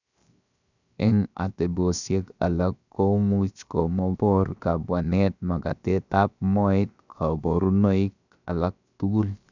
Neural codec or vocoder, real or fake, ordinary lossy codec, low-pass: codec, 16 kHz, 0.7 kbps, FocalCodec; fake; none; 7.2 kHz